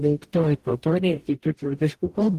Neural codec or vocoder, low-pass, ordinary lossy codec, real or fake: codec, 44.1 kHz, 0.9 kbps, DAC; 14.4 kHz; Opus, 16 kbps; fake